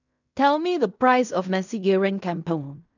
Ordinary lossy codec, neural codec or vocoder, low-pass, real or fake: none; codec, 16 kHz in and 24 kHz out, 0.4 kbps, LongCat-Audio-Codec, fine tuned four codebook decoder; 7.2 kHz; fake